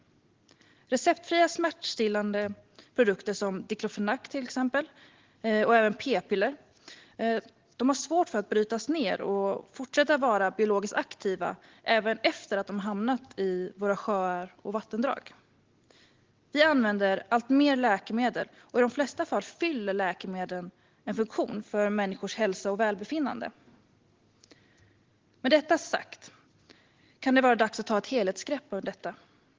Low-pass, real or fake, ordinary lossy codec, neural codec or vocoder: 7.2 kHz; real; Opus, 16 kbps; none